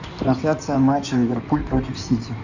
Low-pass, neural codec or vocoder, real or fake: 7.2 kHz; codec, 16 kHz in and 24 kHz out, 2.2 kbps, FireRedTTS-2 codec; fake